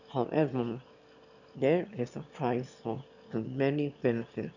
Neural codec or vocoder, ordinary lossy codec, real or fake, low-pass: autoencoder, 22.05 kHz, a latent of 192 numbers a frame, VITS, trained on one speaker; none; fake; 7.2 kHz